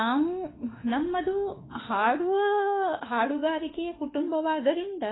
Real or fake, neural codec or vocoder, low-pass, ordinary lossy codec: fake; autoencoder, 48 kHz, 32 numbers a frame, DAC-VAE, trained on Japanese speech; 7.2 kHz; AAC, 16 kbps